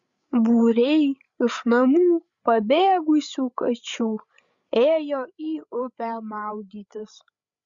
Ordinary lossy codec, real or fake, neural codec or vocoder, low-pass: Opus, 64 kbps; fake; codec, 16 kHz, 8 kbps, FreqCodec, larger model; 7.2 kHz